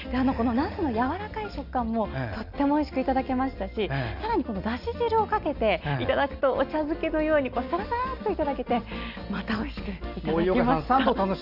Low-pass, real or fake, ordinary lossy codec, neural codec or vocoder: 5.4 kHz; real; Opus, 64 kbps; none